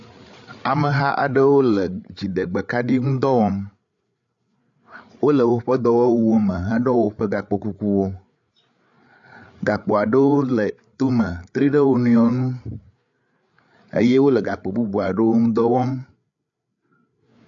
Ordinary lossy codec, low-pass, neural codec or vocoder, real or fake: AAC, 64 kbps; 7.2 kHz; codec, 16 kHz, 8 kbps, FreqCodec, larger model; fake